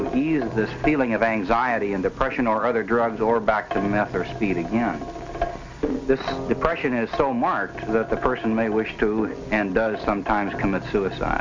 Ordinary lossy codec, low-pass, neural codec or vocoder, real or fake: MP3, 48 kbps; 7.2 kHz; none; real